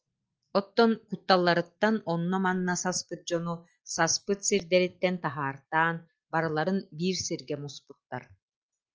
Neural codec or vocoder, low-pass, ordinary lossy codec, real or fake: none; 7.2 kHz; Opus, 24 kbps; real